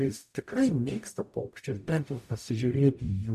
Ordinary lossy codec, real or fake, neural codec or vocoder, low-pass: AAC, 96 kbps; fake; codec, 44.1 kHz, 0.9 kbps, DAC; 14.4 kHz